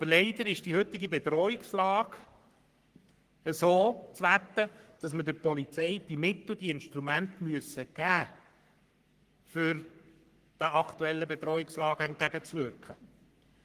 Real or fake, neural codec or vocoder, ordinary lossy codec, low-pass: fake; codec, 44.1 kHz, 3.4 kbps, Pupu-Codec; Opus, 24 kbps; 14.4 kHz